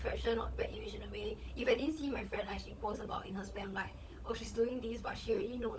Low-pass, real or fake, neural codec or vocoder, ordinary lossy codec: none; fake; codec, 16 kHz, 16 kbps, FunCodec, trained on LibriTTS, 50 frames a second; none